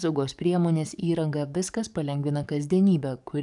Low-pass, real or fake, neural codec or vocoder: 10.8 kHz; fake; codec, 44.1 kHz, 7.8 kbps, DAC